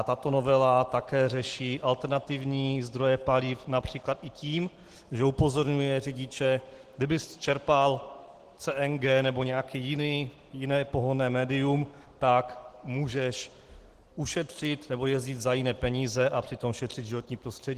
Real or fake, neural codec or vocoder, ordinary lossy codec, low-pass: real; none; Opus, 16 kbps; 14.4 kHz